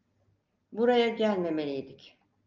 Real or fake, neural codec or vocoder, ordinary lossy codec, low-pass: real; none; Opus, 24 kbps; 7.2 kHz